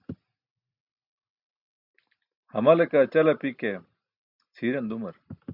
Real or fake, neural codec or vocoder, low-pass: real; none; 5.4 kHz